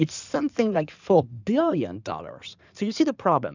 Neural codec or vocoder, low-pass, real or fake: codec, 16 kHz in and 24 kHz out, 2.2 kbps, FireRedTTS-2 codec; 7.2 kHz; fake